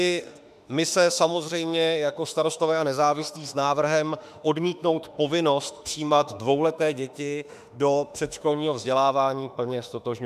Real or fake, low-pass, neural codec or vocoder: fake; 14.4 kHz; autoencoder, 48 kHz, 32 numbers a frame, DAC-VAE, trained on Japanese speech